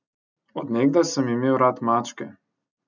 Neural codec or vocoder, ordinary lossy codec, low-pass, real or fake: none; none; 7.2 kHz; real